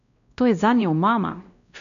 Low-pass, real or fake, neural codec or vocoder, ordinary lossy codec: 7.2 kHz; fake; codec, 16 kHz, 1 kbps, X-Codec, WavLM features, trained on Multilingual LibriSpeech; none